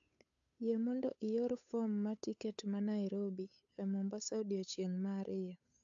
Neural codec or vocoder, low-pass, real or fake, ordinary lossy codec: codec, 16 kHz, 8 kbps, FunCodec, trained on Chinese and English, 25 frames a second; 7.2 kHz; fake; AAC, 64 kbps